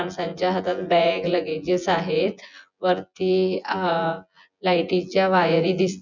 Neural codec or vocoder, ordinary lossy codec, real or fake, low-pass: vocoder, 24 kHz, 100 mel bands, Vocos; none; fake; 7.2 kHz